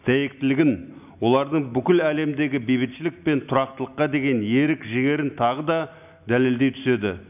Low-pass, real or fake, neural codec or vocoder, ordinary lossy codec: 3.6 kHz; real; none; none